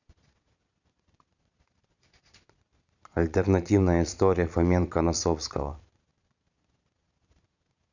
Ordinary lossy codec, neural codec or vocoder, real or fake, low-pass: none; vocoder, 22.05 kHz, 80 mel bands, Vocos; fake; 7.2 kHz